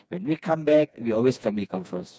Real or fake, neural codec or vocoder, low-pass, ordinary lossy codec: fake; codec, 16 kHz, 2 kbps, FreqCodec, smaller model; none; none